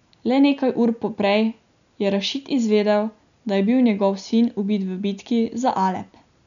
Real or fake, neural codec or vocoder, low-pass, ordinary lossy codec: real; none; 7.2 kHz; none